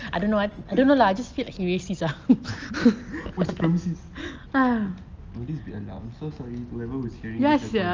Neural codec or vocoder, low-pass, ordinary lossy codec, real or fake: none; 7.2 kHz; Opus, 24 kbps; real